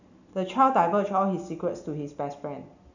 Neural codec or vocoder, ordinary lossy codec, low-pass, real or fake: none; none; 7.2 kHz; real